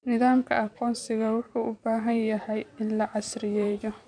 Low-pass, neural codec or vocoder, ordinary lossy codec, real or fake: 9.9 kHz; vocoder, 44.1 kHz, 128 mel bands every 256 samples, BigVGAN v2; none; fake